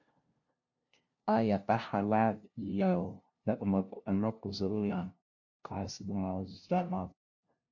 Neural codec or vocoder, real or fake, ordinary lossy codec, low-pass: codec, 16 kHz, 0.5 kbps, FunCodec, trained on LibriTTS, 25 frames a second; fake; MP3, 48 kbps; 7.2 kHz